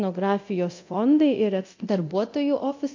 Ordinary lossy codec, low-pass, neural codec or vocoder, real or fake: MP3, 48 kbps; 7.2 kHz; codec, 24 kHz, 0.9 kbps, DualCodec; fake